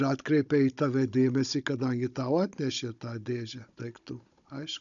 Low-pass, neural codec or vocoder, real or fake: 7.2 kHz; codec, 16 kHz, 16 kbps, FunCodec, trained on Chinese and English, 50 frames a second; fake